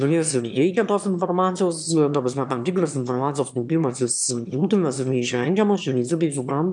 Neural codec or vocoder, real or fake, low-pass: autoencoder, 22.05 kHz, a latent of 192 numbers a frame, VITS, trained on one speaker; fake; 9.9 kHz